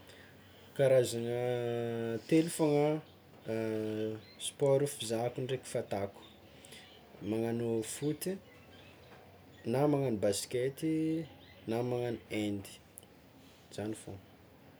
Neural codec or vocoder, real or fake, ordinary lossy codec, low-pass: none; real; none; none